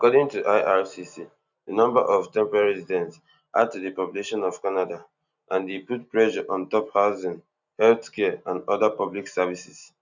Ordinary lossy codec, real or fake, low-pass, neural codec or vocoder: none; real; 7.2 kHz; none